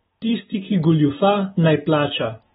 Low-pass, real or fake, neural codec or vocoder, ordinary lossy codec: 9.9 kHz; real; none; AAC, 16 kbps